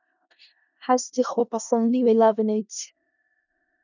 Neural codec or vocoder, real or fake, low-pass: codec, 16 kHz in and 24 kHz out, 0.4 kbps, LongCat-Audio-Codec, four codebook decoder; fake; 7.2 kHz